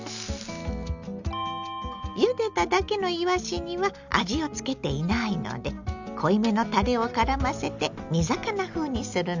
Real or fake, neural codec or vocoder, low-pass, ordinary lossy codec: real; none; 7.2 kHz; none